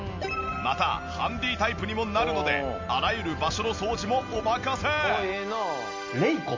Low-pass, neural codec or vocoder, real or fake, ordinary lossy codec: 7.2 kHz; none; real; MP3, 48 kbps